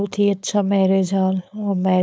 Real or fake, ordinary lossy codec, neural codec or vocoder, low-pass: fake; none; codec, 16 kHz, 4.8 kbps, FACodec; none